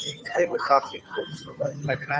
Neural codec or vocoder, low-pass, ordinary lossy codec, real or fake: codec, 16 kHz, 2 kbps, FunCodec, trained on Chinese and English, 25 frames a second; none; none; fake